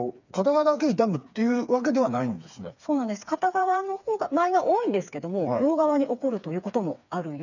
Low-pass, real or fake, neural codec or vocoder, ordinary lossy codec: 7.2 kHz; fake; codec, 16 kHz, 4 kbps, FreqCodec, smaller model; none